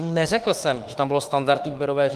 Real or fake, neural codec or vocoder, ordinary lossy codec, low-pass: fake; autoencoder, 48 kHz, 32 numbers a frame, DAC-VAE, trained on Japanese speech; Opus, 24 kbps; 14.4 kHz